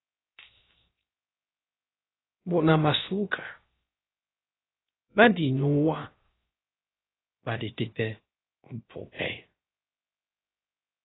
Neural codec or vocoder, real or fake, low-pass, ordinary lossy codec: codec, 16 kHz, 0.3 kbps, FocalCodec; fake; 7.2 kHz; AAC, 16 kbps